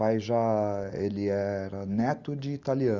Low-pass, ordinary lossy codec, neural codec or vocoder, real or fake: 7.2 kHz; Opus, 32 kbps; none; real